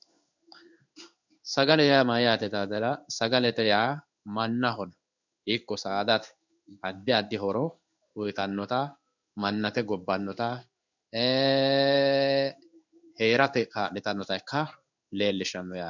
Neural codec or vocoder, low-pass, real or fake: codec, 16 kHz in and 24 kHz out, 1 kbps, XY-Tokenizer; 7.2 kHz; fake